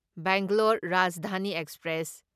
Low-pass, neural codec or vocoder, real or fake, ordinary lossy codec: 14.4 kHz; none; real; none